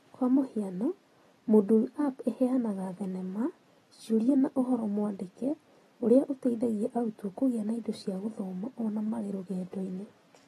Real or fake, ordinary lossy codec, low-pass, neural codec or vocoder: real; AAC, 32 kbps; 19.8 kHz; none